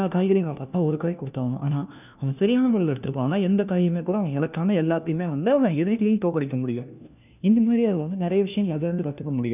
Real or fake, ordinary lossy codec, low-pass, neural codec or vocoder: fake; none; 3.6 kHz; codec, 16 kHz, 1 kbps, FunCodec, trained on LibriTTS, 50 frames a second